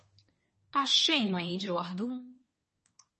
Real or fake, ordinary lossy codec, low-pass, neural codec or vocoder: fake; MP3, 32 kbps; 10.8 kHz; codec, 24 kHz, 1 kbps, SNAC